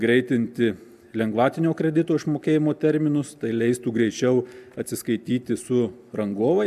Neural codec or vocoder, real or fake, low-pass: none; real; 14.4 kHz